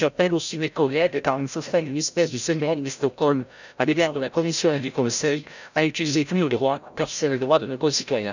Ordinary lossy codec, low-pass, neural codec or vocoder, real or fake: none; 7.2 kHz; codec, 16 kHz, 0.5 kbps, FreqCodec, larger model; fake